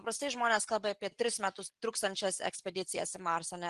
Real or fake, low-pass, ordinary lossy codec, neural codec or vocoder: real; 10.8 kHz; Opus, 24 kbps; none